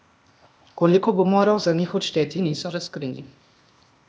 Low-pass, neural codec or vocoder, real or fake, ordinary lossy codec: none; codec, 16 kHz, 0.8 kbps, ZipCodec; fake; none